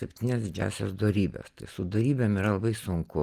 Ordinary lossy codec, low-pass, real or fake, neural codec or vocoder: Opus, 24 kbps; 14.4 kHz; real; none